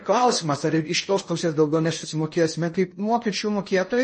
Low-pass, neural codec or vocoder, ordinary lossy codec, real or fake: 10.8 kHz; codec, 16 kHz in and 24 kHz out, 0.6 kbps, FocalCodec, streaming, 4096 codes; MP3, 32 kbps; fake